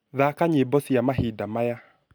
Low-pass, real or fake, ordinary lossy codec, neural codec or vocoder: none; real; none; none